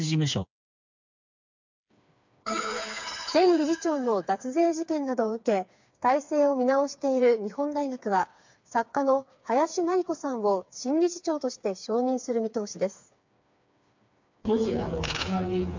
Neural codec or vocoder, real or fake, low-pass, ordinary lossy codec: codec, 16 kHz, 4 kbps, FreqCodec, smaller model; fake; 7.2 kHz; MP3, 64 kbps